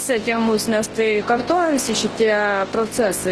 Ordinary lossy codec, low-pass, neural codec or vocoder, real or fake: Opus, 16 kbps; 10.8 kHz; codec, 24 kHz, 0.9 kbps, WavTokenizer, large speech release; fake